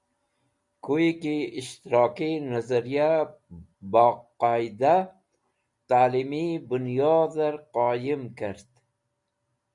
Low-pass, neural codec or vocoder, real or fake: 10.8 kHz; none; real